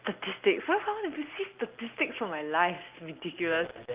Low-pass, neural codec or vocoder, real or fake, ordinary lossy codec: 3.6 kHz; none; real; Opus, 24 kbps